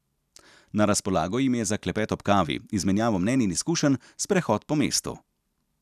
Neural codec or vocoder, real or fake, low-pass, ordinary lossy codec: none; real; 14.4 kHz; none